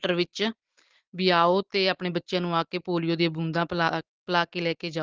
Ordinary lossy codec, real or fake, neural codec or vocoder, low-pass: Opus, 16 kbps; real; none; 7.2 kHz